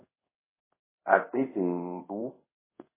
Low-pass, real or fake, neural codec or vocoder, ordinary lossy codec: 3.6 kHz; fake; codec, 24 kHz, 0.5 kbps, DualCodec; MP3, 16 kbps